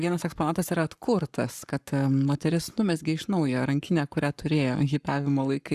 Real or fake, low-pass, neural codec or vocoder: fake; 14.4 kHz; codec, 44.1 kHz, 7.8 kbps, Pupu-Codec